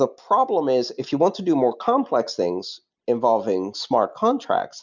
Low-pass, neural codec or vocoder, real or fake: 7.2 kHz; none; real